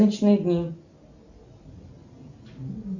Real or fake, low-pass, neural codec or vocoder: real; 7.2 kHz; none